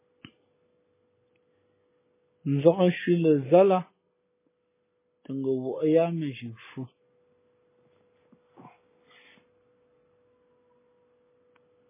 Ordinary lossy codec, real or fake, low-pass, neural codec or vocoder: MP3, 16 kbps; real; 3.6 kHz; none